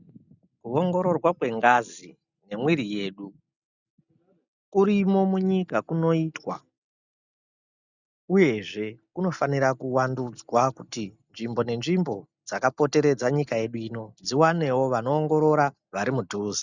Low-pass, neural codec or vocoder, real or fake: 7.2 kHz; none; real